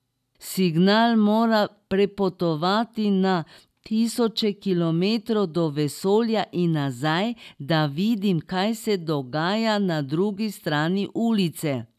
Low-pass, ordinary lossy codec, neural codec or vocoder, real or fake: 14.4 kHz; none; none; real